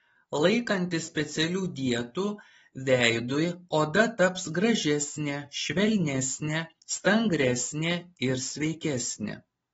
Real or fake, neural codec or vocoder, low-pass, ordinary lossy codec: real; none; 10.8 kHz; AAC, 24 kbps